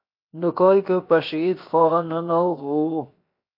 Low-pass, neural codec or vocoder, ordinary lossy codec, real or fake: 5.4 kHz; codec, 16 kHz, 0.7 kbps, FocalCodec; MP3, 32 kbps; fake